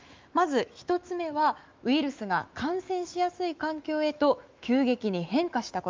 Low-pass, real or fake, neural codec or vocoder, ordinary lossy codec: 7.2 kHz; fake; autoencoder, 48 kHz, 128 numbers a frame, DAC-VAE, trained on Japanese speech; Opus, 32 kbps